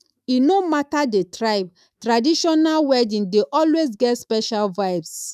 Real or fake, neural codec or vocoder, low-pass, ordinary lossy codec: fake; autoencoder, 48 kHz, 128 numbers a frame, DAC-VAE, trained on Japanese speech; 14.4 kHz; none